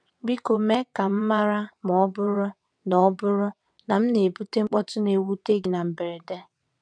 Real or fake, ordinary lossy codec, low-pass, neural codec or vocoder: fake; none; 9.9 kHz; vocoder, 22.05 kHz, 80 mel bands, WaveNeXt